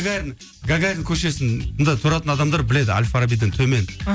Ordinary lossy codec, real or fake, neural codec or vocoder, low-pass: none; real; none; none